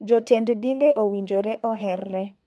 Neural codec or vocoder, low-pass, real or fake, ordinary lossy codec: codec, 24 kHz, 1 kbps, SNAC; none; fake; none